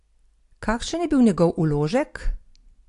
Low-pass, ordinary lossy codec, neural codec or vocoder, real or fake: 10.8 kHz; AAC, 48 kbps; none; real